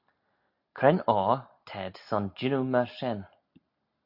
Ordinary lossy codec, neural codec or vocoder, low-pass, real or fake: AAC, 48 kbps; none; 5.4 kHz; real